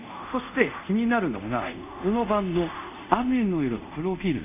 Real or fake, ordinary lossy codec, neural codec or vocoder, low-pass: fake; none; codec, 24 kHz, 0.5 kbps, DualCodec; 3.6 kHz